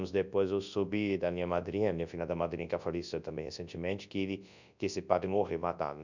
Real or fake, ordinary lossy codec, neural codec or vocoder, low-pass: fake; none; codec, 24 kHz, 0.9 kbps, WavTokenizer, large speech release; 7.2 kHz